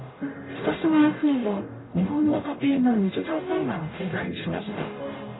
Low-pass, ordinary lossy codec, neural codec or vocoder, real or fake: 7.2 kHz; AAC, 16 kbps; codec, 44.1 kHz, 0.9 kbps, DAC; fake